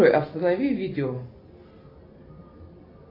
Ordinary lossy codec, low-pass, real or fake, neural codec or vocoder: AAC, 32 kbps; 5.4 kHz; real; none